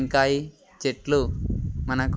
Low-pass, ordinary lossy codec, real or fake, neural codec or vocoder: none; none; real; none